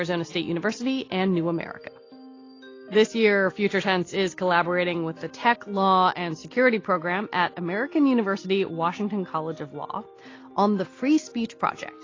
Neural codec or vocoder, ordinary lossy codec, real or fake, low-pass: none; AAC, 32 kbps; real; 7.2 kHz